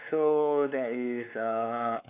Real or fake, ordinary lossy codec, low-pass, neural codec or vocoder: fake; none; 3.6 kHz; codec, 16 kHz, 4 kbps, X-Codec, WavLM features, trained on Multilingual LibriSpeech